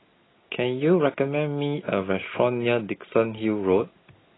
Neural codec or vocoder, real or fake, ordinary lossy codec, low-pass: none; real; AAC, 16 kbps; 7.2 kHz